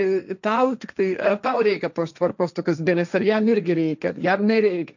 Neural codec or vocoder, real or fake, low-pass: codec, 16 kHz, 1.1 kbps, Voila-Tokenizer; fake; 7.2 kHz